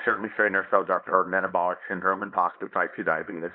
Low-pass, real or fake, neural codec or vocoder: 5.4 kHz; fake; codec, 24 kHz, 0.9 kbps, WavTokenizer, small release